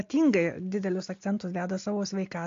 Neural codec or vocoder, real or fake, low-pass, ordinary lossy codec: none; real; 7.2 kHz; AAC, 48 kbps